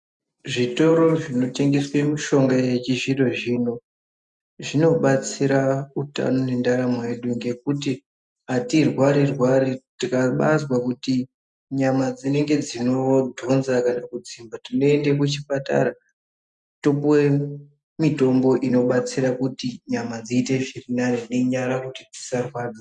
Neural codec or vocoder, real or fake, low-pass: none; real; 10.8 kHz